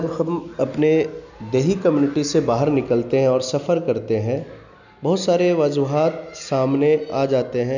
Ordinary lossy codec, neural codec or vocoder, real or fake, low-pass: none; none; real; 7.2 kHz